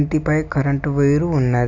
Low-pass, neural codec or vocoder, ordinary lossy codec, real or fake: 7.2 kHz; none; none; real